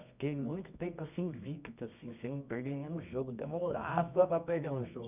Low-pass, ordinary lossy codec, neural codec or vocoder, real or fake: 3.6 kHz; none; codec, 24 kHz, 0.9 kbps, WavTokenizer, medium music audio release; fake